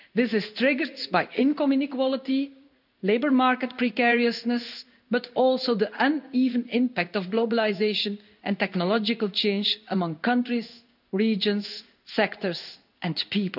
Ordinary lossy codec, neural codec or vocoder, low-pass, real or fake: none; codec, 16 kHz in and 24 kHz out, 1 kbps, XY-Tokenizer; 5.4 kHz; fake